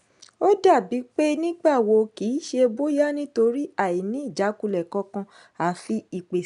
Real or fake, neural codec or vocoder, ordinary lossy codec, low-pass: real; none; none; 10.8 kHz